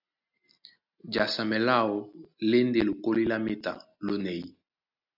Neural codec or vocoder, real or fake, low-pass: none; real; 5.4 kHz